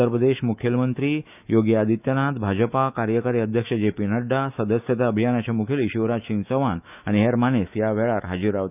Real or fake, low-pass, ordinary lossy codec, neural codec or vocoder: fake; 3.6 kHz; none; autoencoder, 48 kHz, 128 numbers a frame, DAC-VAE, trained on Japanese speech